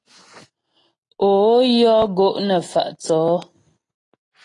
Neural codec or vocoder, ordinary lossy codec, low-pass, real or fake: none; AAC, 32 kbps; 10.8 kHz; real